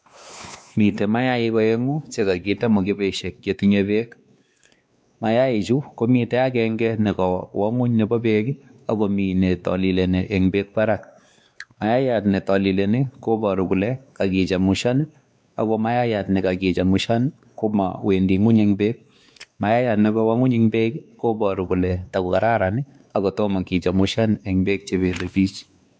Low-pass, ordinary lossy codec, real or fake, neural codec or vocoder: none; none; fake; codec, 16 kHz, 2 kbps, X-Codec, WavLM features, trained on Multilingual LibriSpeech